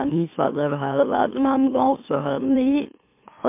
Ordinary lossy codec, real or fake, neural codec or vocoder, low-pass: MP3, 32 kbps; fake; autoencoder, 44.1 kHz, a latent of 192 numbers a frame, MeloTTS; 3.6 kHz